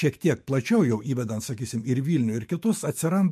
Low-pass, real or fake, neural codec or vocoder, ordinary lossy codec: 14.4 kHz; fake; autoencoder, 48 kHz, 128 numbers a frame, DAC-VAE, trained on Japanese speech; MP3, 64 kbps